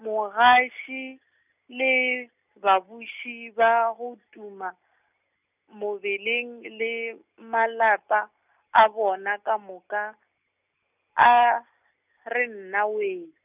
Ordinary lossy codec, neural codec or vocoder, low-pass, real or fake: none; none; 3.6 kHz; real